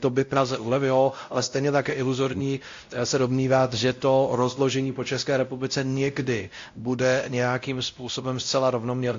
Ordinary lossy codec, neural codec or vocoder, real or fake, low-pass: AAC, 48 kbps; codec, 16 kHz, 0.5 kbps, X-Codec, WavLM features, trained on Multilingual LibriSpeech; fake; 7.2 kHz